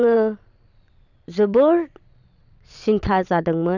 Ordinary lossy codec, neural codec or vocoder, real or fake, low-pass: none; vocoder, 22.05 kHz, 80 mel bands, Vocos; fake; 7.2 kHz